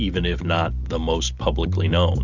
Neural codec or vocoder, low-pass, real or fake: none; 7.2 kHz; real